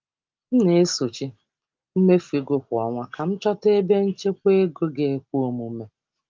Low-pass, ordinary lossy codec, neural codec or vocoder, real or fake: 7.2 kHz; Opus, 32 kbps; none; real